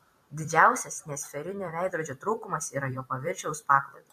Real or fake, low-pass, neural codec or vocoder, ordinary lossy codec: fake; 14.4 kHz; vocoder, 44.1 kHz, 128 mel bands every 256 samples, BigVGAN v2; MP3, 64 kbps